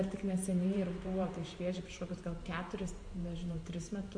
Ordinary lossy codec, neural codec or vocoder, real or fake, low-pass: MP3, 48 kbps; vocoder, 44.1 kHz, 128 mel bands every 512 samples, BigVGAN v2; fake; 9.9 kHz